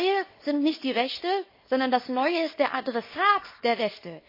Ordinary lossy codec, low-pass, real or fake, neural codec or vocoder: MP3, 24 kbps; 5.4 kHz; fake; codec, 24 kHz, 0.9 kbps, WavTokenizer, small release